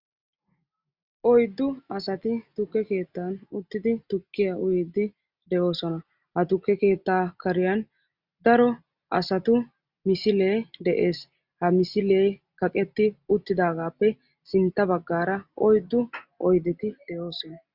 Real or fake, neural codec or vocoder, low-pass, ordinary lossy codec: real; none; 5.4 kHz; Opus, 64 kbps